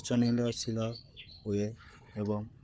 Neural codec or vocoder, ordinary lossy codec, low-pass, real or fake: codec, 16 kHz, 4 kbps, FunCodec, trained on Chinese and English, 50 frames a second; none; none; fake